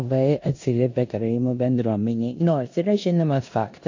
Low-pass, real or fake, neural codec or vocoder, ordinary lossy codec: 7.2 kHz; fake; codec, 16 kHz in and 24 kHz out, 0.9 kbps, LongCat-Audio-Codec, four codebook decoder; AAC, 48 kbps